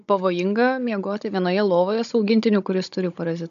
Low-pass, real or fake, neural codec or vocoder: 7.2 kHz; fake; codec, 16 kHz, 16 kbps, FunCodec, trained on Chinese and English, 50 frames a second